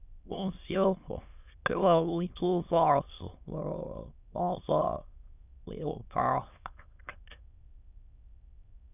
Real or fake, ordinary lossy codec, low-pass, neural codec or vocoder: fake; none; 3.6 kHz; autoencoder, 22.05 kHz, a latent of 192 numbers a frame, VITS, trained on many speakers